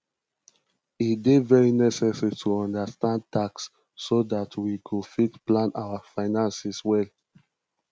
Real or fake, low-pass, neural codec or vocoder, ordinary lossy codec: real; none; none; none